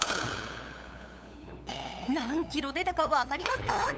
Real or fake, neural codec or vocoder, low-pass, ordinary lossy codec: fake; codec, 16 kHz, 8 kbps, FunCodec, trained on LibriTTS, 25 frames a second; none; none